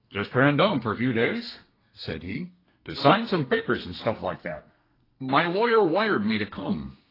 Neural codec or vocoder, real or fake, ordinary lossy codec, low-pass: codec, 32 kHz, 1.9 kbps, SNAC; fake; AAC, 24 kbps; 5.4 kHz